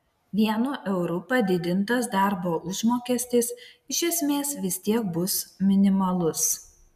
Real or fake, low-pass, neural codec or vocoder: real; 14.4 kHz; none